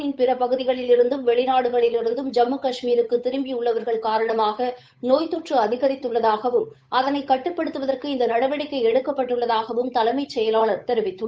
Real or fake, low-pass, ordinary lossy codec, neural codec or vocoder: fake; none; none; codec, 16 kHz, 8 kbps, FunCodec, trained on Chinese and English, 25 frames a second